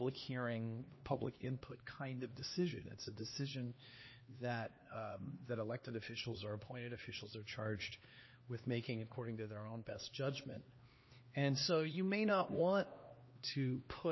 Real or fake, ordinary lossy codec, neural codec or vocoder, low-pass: fake; MP3, 24 kbps; codec, 16 kHz, 2 kbps, X-Codec, HuBERT features, trained on LibriSpeech; 7.2 kHz